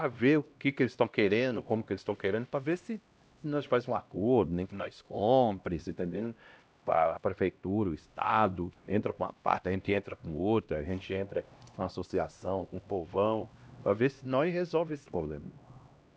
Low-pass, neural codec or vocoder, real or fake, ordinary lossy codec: none; codec, 16 kHz, 1 kbps, X-Codec, HuBERT features, trained on LibriSpeech; fake; none